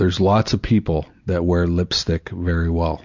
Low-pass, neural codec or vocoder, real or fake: 7.2 kHz; none; real